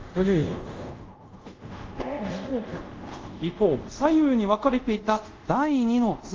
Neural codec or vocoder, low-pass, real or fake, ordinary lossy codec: codec, 24 kHz, 0.5 kbps, DualCodec; 7.2 kHz; fake; Opus, 32 kbps